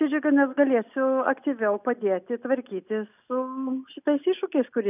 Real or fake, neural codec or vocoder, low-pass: real; none; 3.6 kHz